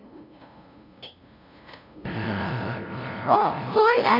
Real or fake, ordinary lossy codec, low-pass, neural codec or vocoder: fake; none; 5.4 kHz; codec, 16 kHz, 0.5 kbps, FunCodec, trained on LibriTTS, 25 frames a second